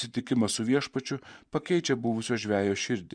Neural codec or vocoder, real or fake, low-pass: vocoder, 24 kHz, 100 mel bands, Vocos; fake; 9.9 kHz